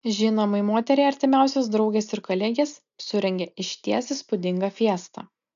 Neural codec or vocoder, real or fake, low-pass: none; real; 7.2 kHz